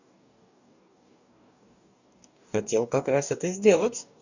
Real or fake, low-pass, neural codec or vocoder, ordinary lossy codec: fake; 7.2 kHz; codec, 44.1 kHz, 2.6 kbps, DAC; none